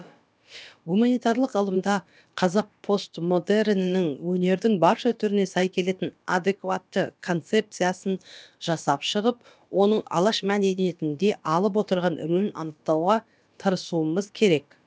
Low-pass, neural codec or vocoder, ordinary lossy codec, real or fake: none; codec, 16 kHz, about 1 kbps, DyCAST, with the encoder's durations; none; fake